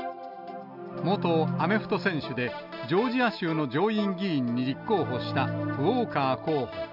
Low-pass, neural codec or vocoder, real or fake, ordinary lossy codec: 5.4 kHz; none; real; none